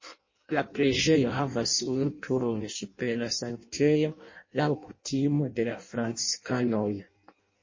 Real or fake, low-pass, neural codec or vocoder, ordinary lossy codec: fake; 7.2 kHz; codec, 16 kHz in and 24 kHz out, 0.6 kbps, FireRedTTS-2 codec; MP3, 32 kbps